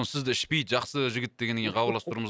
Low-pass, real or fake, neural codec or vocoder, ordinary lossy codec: none; real; none; none